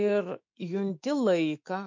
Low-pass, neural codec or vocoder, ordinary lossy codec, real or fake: 7.2 kHz; autoencoder, 48 kHz, 128 numbers a frame, DAC-VAE, trained on Japanese speech; MP3, 48 kbps; fake